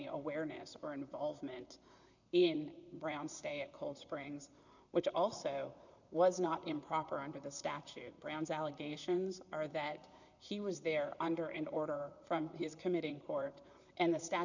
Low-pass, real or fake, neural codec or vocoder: 7.2 kHz; fake; vocoder, 44.1 kHz, 128 mel bands, Pupu-Vocoder